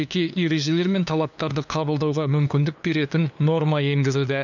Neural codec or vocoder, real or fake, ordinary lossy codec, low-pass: codec, 16 kHz, 2 kbps, FunCodec, trained on LibriTTS, 25 frames a second; fake; none; 7.2 kHz